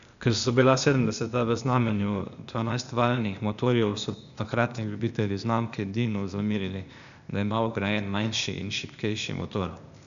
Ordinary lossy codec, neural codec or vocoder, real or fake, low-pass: none; codec, 16 kHz, 0.8 kbps, ZipCodec; fake; 7.2 kHz